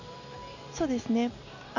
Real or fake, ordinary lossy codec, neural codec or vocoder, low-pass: real; none; none; 7.2 kHz